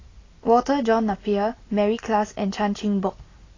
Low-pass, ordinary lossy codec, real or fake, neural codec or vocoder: 7.2 kHz; AAC, 32 kbps; real; none